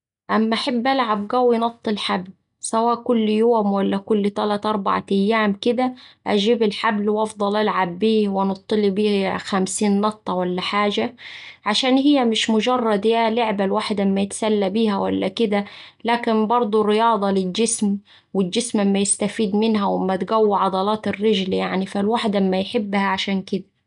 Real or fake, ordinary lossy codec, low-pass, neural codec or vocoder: real; none; 10.8 kHz; none